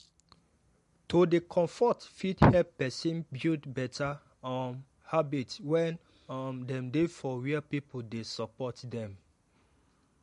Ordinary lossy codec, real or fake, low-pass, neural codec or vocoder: MP3, 48 kbps; real; 14.4 kHz; none